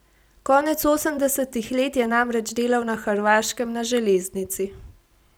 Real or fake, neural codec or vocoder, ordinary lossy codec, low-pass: real; none; none; none